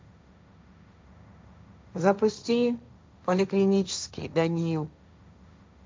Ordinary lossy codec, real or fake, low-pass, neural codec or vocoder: none; fake; none; codec, 16 kHz, 1.1 kbps, Voila-Tokenizer